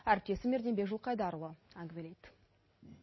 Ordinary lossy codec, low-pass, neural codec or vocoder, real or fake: MP3, 24 kbps; 7.2 kHz; none; real